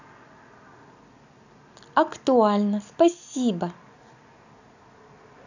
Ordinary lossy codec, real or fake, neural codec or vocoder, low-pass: none; real; none; 7.2 kHz